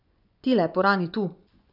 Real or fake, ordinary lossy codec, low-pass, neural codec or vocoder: fake; none; 5.4 kHz; vocoder, 24 kHz, 100 mel bands, Vocos